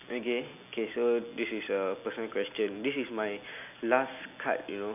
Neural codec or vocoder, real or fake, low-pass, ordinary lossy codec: none; real; 3.6 kHz; none